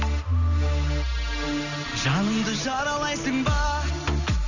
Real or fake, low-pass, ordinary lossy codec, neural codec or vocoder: real; 7.2 kHz; none; none